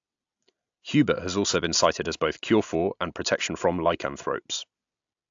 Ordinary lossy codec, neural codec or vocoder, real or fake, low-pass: AAC, 64 kbps; none; real; 7.2 kHz